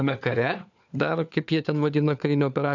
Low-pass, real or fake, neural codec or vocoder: 7.2 kHz; fake; codec, 16 kHz, 4 kbps, FunCodec, trained on LibriTTS, 50 frames a second